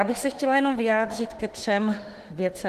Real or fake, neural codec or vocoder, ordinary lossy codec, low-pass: fake; autoencoder, 48 kHz, 32 numbers a frame, DAC-VAE, trained on Japanese speech; Opus, 16 kbps; 14.4 kHz